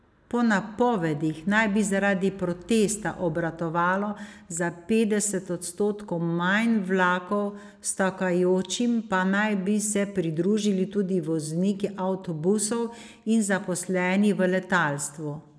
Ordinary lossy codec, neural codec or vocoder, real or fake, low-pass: none; none; real; none